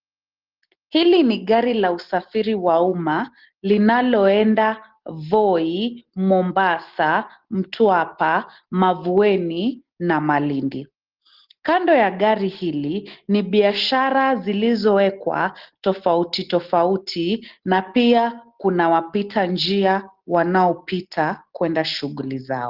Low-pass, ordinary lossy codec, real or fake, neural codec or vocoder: 5.4 kHz; Opus, 16 kbps; real; none